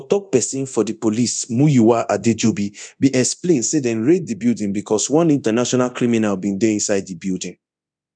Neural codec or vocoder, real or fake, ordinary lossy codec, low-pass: codec, 24 kHz, 0.9 kbps, DualCodec; fake; none; 9.9 kHz